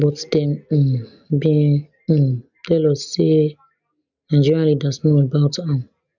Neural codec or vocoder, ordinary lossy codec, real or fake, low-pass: none; none; real; 7.2 kHz